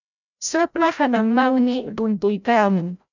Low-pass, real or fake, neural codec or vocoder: 7.2 kHz; fake; codec, 16 kHz, 0.5 kbps, FreqCodec, larger model